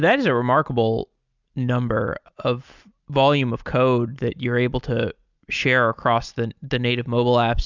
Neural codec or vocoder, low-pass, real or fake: none; 7.2 kHz; real